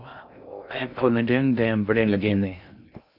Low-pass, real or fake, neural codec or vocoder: 5.4 kHz; fake; codec, 16 kHz in and 24 kHz out, 0.6 kbps, FocalCodec, streaming, 2048 codes